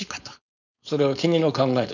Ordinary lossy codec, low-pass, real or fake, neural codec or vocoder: none; 7.2 kHz; fake; codec, 16 kHz, 4.8 kbps, FACodec